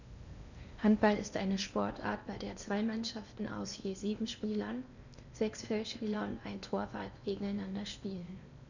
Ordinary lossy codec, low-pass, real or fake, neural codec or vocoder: none; 7.2 kHz; fake; codec, 16 kHz in and 24 kHz out, 0.8 kbps, FocalCodec, streaming, 65536 codes